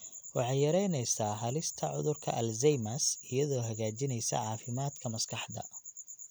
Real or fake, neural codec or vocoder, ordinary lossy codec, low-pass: real; none; none; none